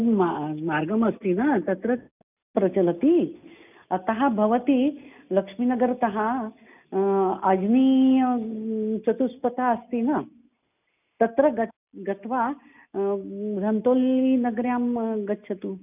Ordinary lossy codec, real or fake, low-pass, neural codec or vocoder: none; real; 3.6 kHz; none